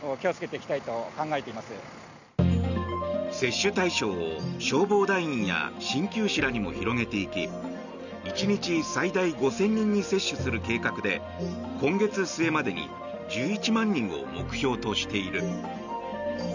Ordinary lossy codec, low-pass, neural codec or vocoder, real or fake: none; 7.2 kHz; none; real